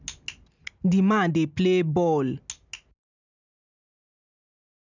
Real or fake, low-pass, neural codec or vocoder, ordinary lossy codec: real; 7.2 kHz; none; none